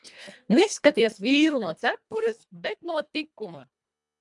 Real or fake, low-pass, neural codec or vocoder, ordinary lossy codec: fake; 10.8 kHz; codec, 24 kHz, 1.5 kbps, HILCodec; MP3, 96 kbps